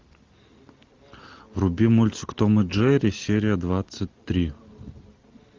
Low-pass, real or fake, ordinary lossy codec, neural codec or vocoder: 7.2 kHz; real; Opus, 32 kbps; none